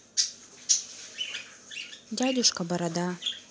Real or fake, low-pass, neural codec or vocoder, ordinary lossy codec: real; none; none; none